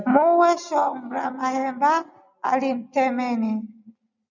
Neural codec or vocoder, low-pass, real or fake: none; 7.2 kHz; real